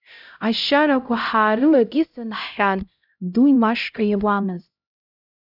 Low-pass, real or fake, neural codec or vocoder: 5.4 kHz; fake; codec, 16 kHz, 0.5 kbps, X-Codec, HuBERT features, trained on LibriSpeech